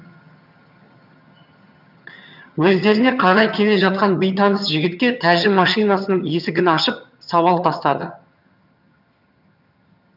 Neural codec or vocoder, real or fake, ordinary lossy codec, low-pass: vocoder, 22.05 kHz, 80 mel bands, HiFi-GAN; fake; none; 5.4 kHz